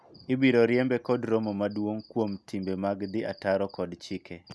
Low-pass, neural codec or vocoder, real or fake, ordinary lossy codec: none; none; real; none